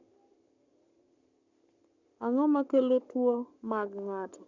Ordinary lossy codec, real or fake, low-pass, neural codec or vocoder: none; fake; 7.2 kHz; codec, 44.1 kHz, 7.8 kbps, Pupu-Codec